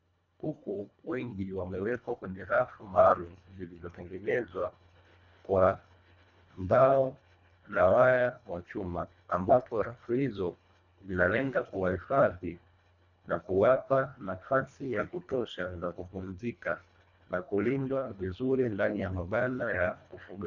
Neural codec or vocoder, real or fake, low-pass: codec, 24 kHz, 1.5 kbps, HILCodec; fake; 7.2 kHz